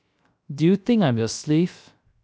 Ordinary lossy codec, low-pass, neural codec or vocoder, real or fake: none; none; codec, 16 kHz, 0.3 kbps, FocalCodec; fake